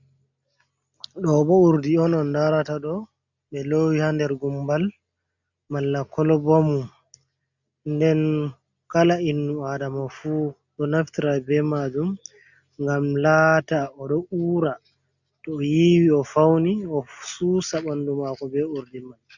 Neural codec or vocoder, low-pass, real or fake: none; 7.2 kHz; real